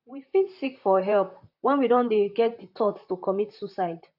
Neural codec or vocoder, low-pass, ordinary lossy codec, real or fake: vocoder, 44.1 kHz, 128 mel bands, Pupu-Vocoder; 5.4 kHz; AAC, 48 kbps; fake